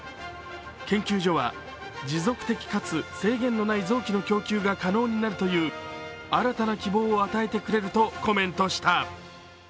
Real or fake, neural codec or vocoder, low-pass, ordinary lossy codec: real; none; none; none